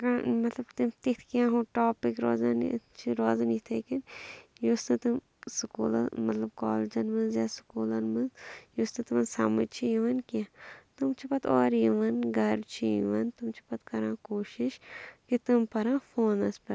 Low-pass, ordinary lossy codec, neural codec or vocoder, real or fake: none; none; none; real